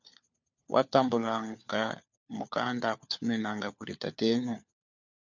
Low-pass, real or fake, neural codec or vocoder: 7.2 kHz; fake; codec, 16 kHz, 4 kbps, FunCodec, trained on LibriTTS, 50 frames a second